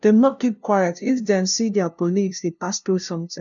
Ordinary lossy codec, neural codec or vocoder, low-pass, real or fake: none; codec, 16 kHz, 0.5 kbps, FunCodec, trained on LibriTTS, 25 frames a second; 7.2 kHz; fake